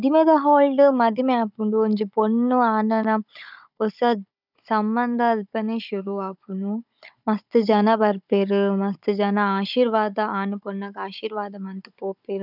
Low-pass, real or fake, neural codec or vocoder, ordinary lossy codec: 5.4 kHz; fake; codec, 16 kHz, 16 kbps, FunCodec, trained on Chinese and English, 50 frames a second; none